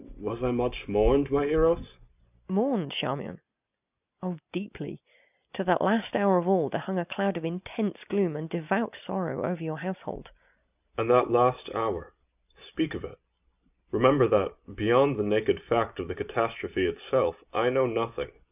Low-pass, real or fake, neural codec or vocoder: 3.6 kHz; real; none